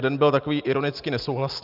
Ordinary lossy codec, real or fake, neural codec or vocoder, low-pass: Opus, 24 kbps; real; none; 5.4 kHz